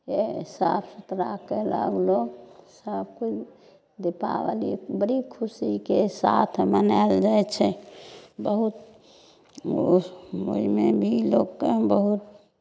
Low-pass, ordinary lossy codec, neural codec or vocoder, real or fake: none; none; none; real